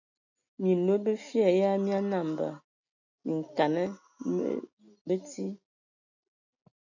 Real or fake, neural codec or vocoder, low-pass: real; none; 7.2 kHz